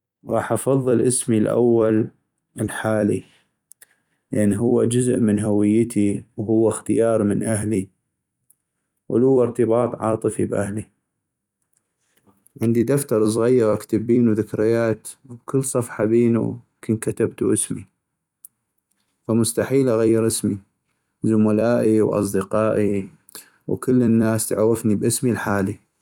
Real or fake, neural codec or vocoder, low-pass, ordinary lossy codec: fake; vocoder, 44.1 kHz, 128 mel bands every 256 samples, BigVGAN v2; 19.8 kHz; none